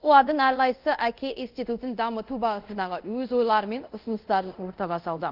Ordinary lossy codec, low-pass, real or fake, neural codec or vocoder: Opus, 32 kbps; 5.4 kHz; fake; codec, 24 kHz, 0.5 kbps, DualCodec